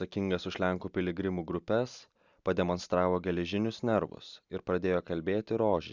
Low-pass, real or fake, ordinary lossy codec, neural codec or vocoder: 7.2 kHz; real; Opus, 64 kbps; none